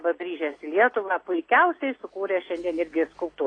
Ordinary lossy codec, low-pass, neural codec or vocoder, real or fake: AAC, 32 kbps; 9.9 kHz; none; real